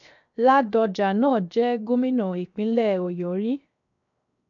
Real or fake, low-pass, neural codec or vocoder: fake; 7.2 kHz; codec, 16 kHz, 0.7 kbps, FocalCodec